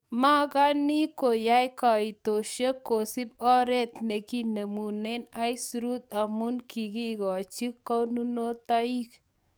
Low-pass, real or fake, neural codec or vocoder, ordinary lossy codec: none; fake; codec, 44.1 kHz, 7.8 kbps, DAC; none